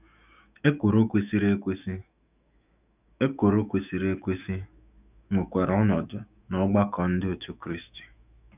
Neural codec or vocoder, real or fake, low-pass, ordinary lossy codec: autoencoder, 48 kHz, 128 numbers a frame, DAC-VAE, trained on Japanese speech; fake; 3.6 kHz; none